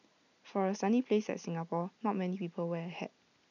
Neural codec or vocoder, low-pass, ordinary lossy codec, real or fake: none; 7.2 kHz; none; real